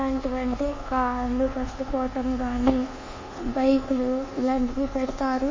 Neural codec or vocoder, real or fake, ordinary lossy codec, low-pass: codec, 24 kHz, 1.2 kbps, DualCodec; fake; MP3, 32 kbps; 7.2 kHz